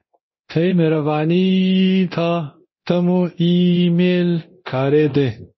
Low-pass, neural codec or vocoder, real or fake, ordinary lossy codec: 7.2 kHz; codec, 24 kHz, 0.9 kbps, DualCodec; fake; MP3, 24 kbps